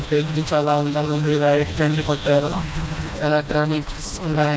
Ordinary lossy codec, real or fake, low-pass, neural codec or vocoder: none; fake; none; codec, 16 kHz, 1 kbps, FreqCodec, smaller model